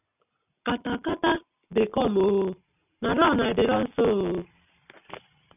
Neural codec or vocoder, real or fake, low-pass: none; real; 3.6 kHz